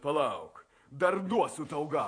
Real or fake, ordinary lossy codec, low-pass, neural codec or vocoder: fake; AAC, 48 kbps; 9.9 kHz; vocoder, 48 kHz, 128 mel bands, Vocos